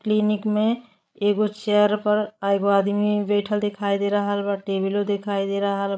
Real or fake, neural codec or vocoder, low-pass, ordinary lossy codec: fake; codec, 16 kHz, 16 kbps, FreqCodec, larger model; none; none